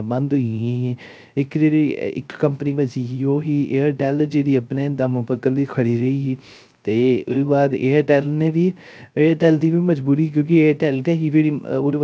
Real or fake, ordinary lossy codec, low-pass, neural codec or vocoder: fake; none; none; codec, 16 kHz, 0.3 kbps, FocalCodec